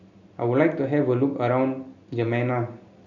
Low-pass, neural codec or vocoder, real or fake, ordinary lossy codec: 7.2 kHz; none; real; none